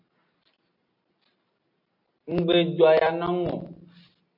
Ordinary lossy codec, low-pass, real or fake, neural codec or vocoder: MP3, 32 kbps; 5.4 kHz; real; none